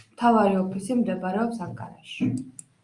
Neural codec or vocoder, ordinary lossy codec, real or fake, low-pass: none; Opus, 32 kbps; real; 10.8 kHz